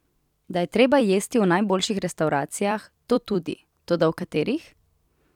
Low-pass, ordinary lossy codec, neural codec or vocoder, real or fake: 19.8 kHz; none; vocoder, 44.1 kHz, 128 mel bands every 256 samples, BigVGAN v2; fake